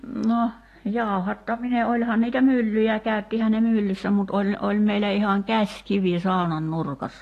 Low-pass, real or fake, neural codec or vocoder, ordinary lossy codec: 14.4 kHz; real; none; AAC, 48 kbps